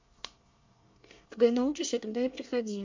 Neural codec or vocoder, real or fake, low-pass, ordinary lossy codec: codec, 24 kHz, 1 kbps, SNAC; fake; 7.2 kHz; MP3, 48 kbps